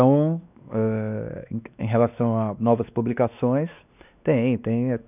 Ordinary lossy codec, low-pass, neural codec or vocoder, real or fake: none; 3.6 kHz; codec, 16 kHz, 2 kbps, X-Codec, WavLM features, trained on Multilingual LibriSpeech; fake